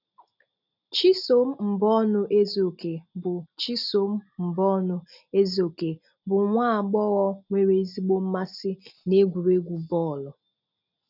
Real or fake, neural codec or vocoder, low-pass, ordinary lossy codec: real; none; 5.4 kHz; none